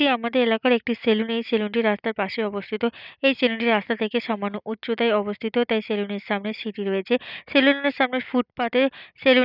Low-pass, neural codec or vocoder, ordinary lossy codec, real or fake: 5.4 kHz; none; none; real